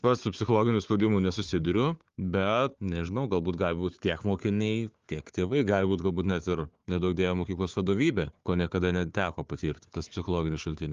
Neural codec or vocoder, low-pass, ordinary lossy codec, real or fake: codec, 16 kHz, 4 kbps, FunCodec, trained on Chinese and English, 50 frames a second; 7.2 kHz; Opus, 32 kbps; fake